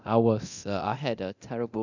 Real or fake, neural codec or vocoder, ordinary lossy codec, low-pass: fake; codec, 24 kHz, 0.9 kbps, DualCodec; none; 7.2 kHz